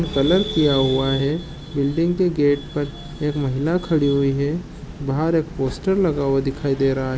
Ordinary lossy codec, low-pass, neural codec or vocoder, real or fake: none; none; none; real